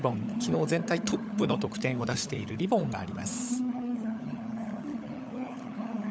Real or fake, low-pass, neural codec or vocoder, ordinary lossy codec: fake; none; codec, 16 kHz, 16 kbps, FunCodec, trained on LibriTTS, 50 frames a second; none